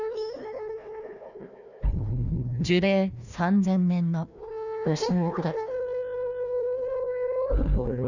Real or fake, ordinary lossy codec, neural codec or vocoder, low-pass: fake; none; codec, 16 kHz, 1 kbps, FunCodec, trained on Chinese and English, 50 frames a second; 7.2 kHz